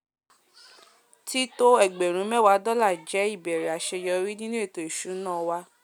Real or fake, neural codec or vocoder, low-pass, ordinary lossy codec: real; none; none; none